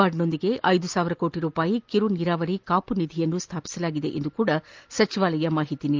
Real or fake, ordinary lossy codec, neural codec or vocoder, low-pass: real; Opus, 32 kbps; none; 7.2 kHz